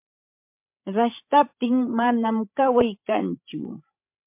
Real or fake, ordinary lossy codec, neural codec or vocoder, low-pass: fake; MP3, 32 kbps; codec, 16 kHz, 16 kbps, FreqCodec, larger model; 3.6 kHz